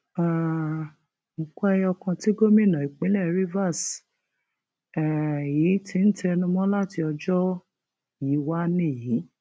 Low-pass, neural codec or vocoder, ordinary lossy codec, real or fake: none; none; none; real